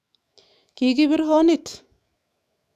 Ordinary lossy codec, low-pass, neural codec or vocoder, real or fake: none; 14.4 kHz; autoencoder, 48 kHz, 128 numbers a frame, DAC-VAE, trained on Japanese speech; fake